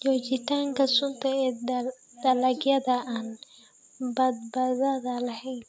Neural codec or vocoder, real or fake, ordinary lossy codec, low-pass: none; real; none; none